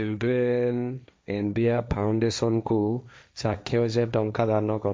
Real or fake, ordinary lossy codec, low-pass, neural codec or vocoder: fake; none; none; codec, 16 kHz, 1.1 kbps, Voila-Tokenizer